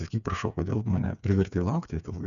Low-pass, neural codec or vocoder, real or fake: 7.2 kHz; codec, 16 kHz, 4 kbps, FreqCodec, smaller model; fake